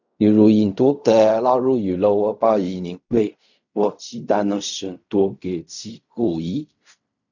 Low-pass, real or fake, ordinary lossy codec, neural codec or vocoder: 7.2 kHz; fake; none; codec, 16 kHz in and 24 kHz out, 0.4 kbps, LongCat-Audio-Codec, fine tuned four codebook decoder